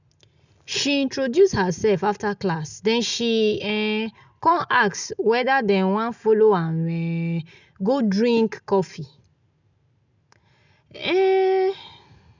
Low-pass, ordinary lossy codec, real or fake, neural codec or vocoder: 7.2 kHz; none; real; none